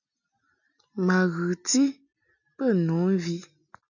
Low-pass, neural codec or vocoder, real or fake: 7.2 kHz; none; real